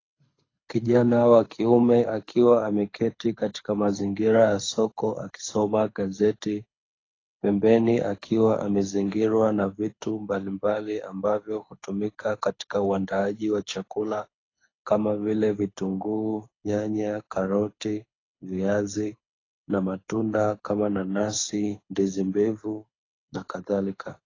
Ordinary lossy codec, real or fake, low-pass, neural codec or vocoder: AAC, 32 kbps; fake; 7.2 kHz; codec, 24 kHz, 6 kbps, HILCodec